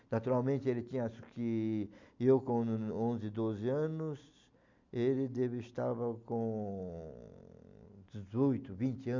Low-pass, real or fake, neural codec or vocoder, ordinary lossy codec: 7.2 kHz; real; none; MP3, 64 kbps